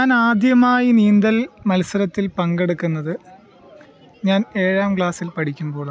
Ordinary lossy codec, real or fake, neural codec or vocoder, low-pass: none; real; none; none